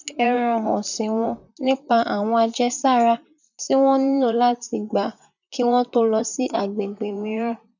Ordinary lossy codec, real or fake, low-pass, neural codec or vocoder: none; fake; 7.2 kHz; vocoder, 44.1 kHz, 128 mel bands, Pupu-Vocoder